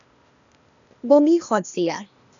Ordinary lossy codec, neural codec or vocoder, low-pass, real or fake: none; codec, 16 kHz, 0.8 kbps, ZipCodec; 7.2 kHz; fake